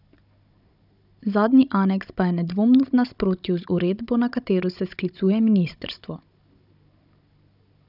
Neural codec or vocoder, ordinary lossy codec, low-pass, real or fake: codec, 16 kHz, 16 kbps, FunCodec, trained on Chinese and English, 50 frames a second; none; 5.4 kHz; fake